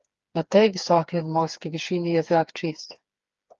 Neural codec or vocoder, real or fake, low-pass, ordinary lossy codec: codec, 16 kHz, 4 kbps, FreqCodec, smaller model; fake; 7.2 kHz; Opus, 32 kbps